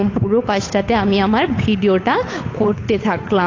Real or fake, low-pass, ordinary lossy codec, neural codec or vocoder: fake; 7.2 kHz; AAC, 32 kbps; codec, 16 kHz, 8 kbps, FunCodec, trained on Chinese and English, 25 frames a second